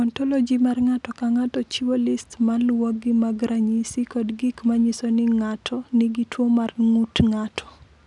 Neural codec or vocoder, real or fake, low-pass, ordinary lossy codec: none; real; 10.8 kHz; none